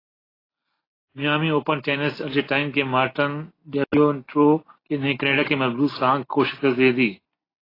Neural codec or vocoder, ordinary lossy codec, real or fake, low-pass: none; AAC, 24 kbps; real; 5.4 kHz